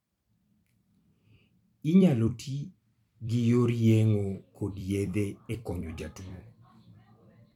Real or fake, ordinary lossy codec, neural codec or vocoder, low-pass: fake; MP3, 96 kbps; vocoder, 44.1 kHz, 128 mel bands every 256 samples, BigVGAN v2; 19.8 kHz